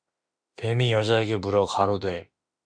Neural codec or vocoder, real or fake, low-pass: autoencoder, 48 kHz, 32 numbers a frame, DAC-VAE, trained on Japanese speech; fake; 9.9 kHz